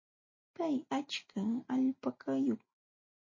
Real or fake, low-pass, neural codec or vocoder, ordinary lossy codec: real; 7.2 kHz; none; MP3, 32 kbps